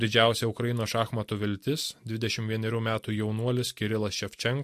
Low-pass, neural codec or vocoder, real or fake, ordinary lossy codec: 14.4 kHz; none; real; MP3, 64 kbps